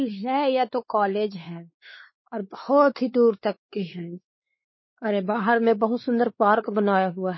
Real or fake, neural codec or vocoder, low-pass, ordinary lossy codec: fake; codec, 16 kHz, 4 kbps, X-Codec, WavLM features, trained on Multilingual LibriSpeech; 7.2 kHz; MP3, 24 kbps